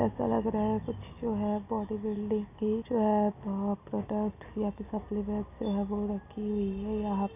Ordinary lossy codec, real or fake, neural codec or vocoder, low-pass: none; real; none; 3.6 kHz